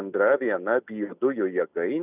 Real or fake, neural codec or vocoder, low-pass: real; none; 3.6 kHz